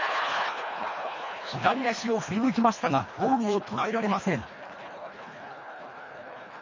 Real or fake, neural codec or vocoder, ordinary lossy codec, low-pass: fake; codec, 24 kHz, 1.5 kbps, HILCodec; MP3, 32 kbps; 7.2 kHz